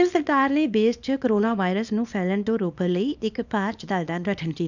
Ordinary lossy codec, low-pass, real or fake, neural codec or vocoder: none; 7.2 kHz; fake; codec, 24 kHz, 0.9 kbps, WavTokenizer, small release